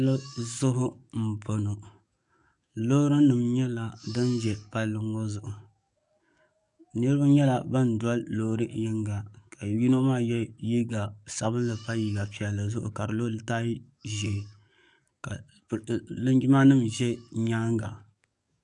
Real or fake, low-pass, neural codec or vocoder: fake; 10.8 kHz; codec, 44.1 kHz, 7.8 kbps, DAC